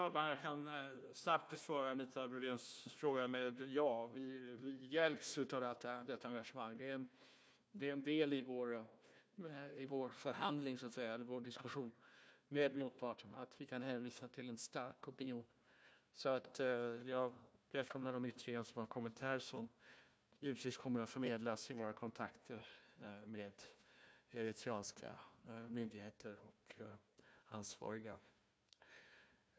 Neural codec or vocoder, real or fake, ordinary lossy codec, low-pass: codec, 16 kHz, 1 kbps, FunCodec, trained on Chinese and English, 50 frames a second; fake; none; none